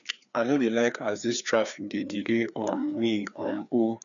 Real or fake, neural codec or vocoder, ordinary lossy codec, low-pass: fake; codec, 16 kHz, 2 kbps, FreqCodec, larger model; none; 7.2 kHz